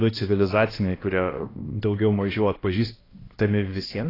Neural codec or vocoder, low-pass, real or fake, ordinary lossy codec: codec, 16 kHz, 1 kbps, X-Codec, HuBERT features, trained on balanced general audio; 5.4 kHz; fake; AAC, 24 kbps